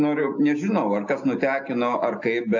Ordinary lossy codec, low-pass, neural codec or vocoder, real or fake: AAC, 48 kbps; 7.2 kHz; none; real